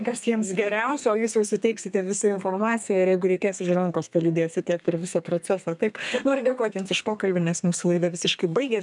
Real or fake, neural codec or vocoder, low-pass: fake; codec, 32 kHz, 1.9 kbps, SNAC; 10.8 kHz